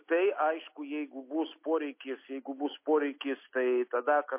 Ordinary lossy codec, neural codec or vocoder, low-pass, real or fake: MP3, 24 kbps; none; 3.6 kHz; real